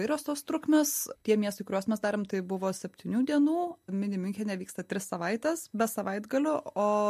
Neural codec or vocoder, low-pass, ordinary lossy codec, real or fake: none; 14.4 kHz; MP3, 64 kbps; real